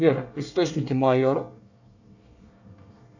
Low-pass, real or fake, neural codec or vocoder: 7.2 kHz; fake; codec, 24 kHz, 1 kbps, SNAC